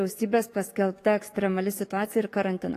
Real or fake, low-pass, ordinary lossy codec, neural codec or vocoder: fake; 14.4 kHz; AAC, 48 kbps; codec, 44.1 kHz, 7.8 kbps, DAC